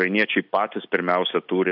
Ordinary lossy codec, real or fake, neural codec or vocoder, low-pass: AAC, 48 kbps; real; none; 5.4 kHz